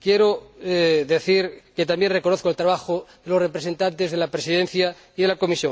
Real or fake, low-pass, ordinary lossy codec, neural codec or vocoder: real; none; none; none